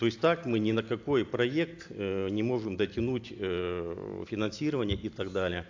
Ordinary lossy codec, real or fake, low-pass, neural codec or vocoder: AAC, 48 kbps; real; 7.2 kHz; none